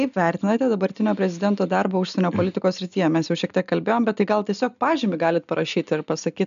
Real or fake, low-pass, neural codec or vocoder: real; 7.2 kHz; none